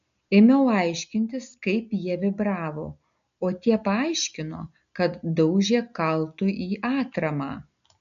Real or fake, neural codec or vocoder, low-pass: real; none; 7.2 kHz